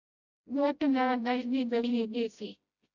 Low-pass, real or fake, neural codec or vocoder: 7.2 kHz; fake; codec, 16 kHz, 0.5 kbps, FreqCodec, smaller model